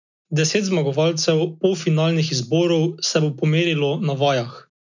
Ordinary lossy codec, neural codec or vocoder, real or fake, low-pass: none; none; real; 7.2 kHz